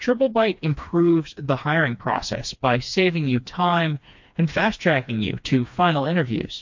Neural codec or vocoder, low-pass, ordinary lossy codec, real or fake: codec, 16 kHz, 2 kbps, FreqCodec, smaller model; 7.2 kHz; MP3, 48 kbps; fake